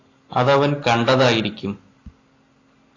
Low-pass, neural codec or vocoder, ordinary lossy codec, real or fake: 7.2 kHz; none; AAC, 32 kbps; real